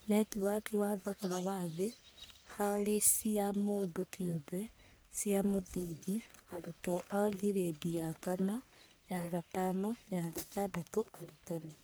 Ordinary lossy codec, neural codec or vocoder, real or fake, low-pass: none; codec, 44.1 kHz, 1.7 kbps, Pupu-Codec; fake; none